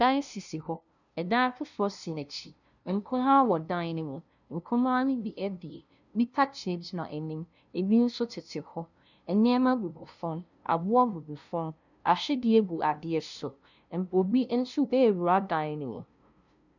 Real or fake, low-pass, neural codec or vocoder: fake; 7.2 kHz; codec, 16 kHz, 0.5 kbps, FunCodec, trained on LibriTTS, 25 frames a second